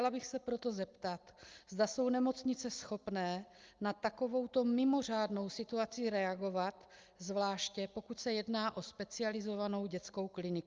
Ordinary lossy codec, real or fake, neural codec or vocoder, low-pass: Opus, 24 kbps; real; none; 7.2 kHz